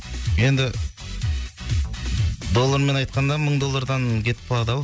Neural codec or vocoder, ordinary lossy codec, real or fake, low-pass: none; none; real; none